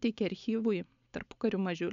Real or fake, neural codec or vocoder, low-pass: real; none; 7.2 kHz